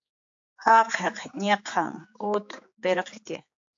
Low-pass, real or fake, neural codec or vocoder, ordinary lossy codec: 7.2 kHz; fake; codec, 16 kHz, 4 kbps, X-Codec, HuBERT features, trained on general audio; AAC, 64 kbps